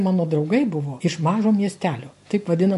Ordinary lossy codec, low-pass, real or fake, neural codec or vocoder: MP3, 48 kbps; 14.4 kHz; real; none